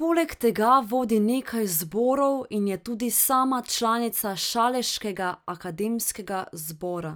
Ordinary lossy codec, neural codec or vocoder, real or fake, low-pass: none; none; real; none